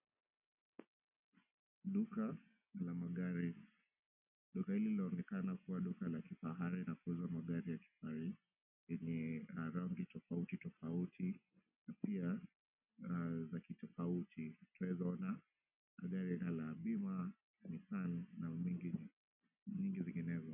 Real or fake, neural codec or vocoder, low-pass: real; none; 3.6 kHz